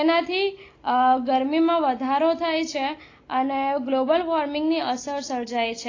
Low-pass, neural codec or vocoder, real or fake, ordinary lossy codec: 7.2 kHz; none; real; AAC, 32 kbps